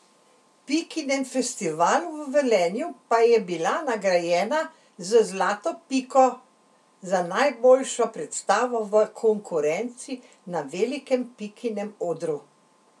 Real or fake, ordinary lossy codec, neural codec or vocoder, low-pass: real; none; none; none